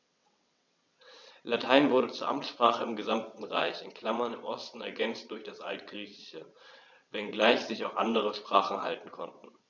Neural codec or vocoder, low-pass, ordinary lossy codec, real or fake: vocoder, 22.05 kHz, 80 mel bands, WaveNeXt; 7.2 kHz; none; fake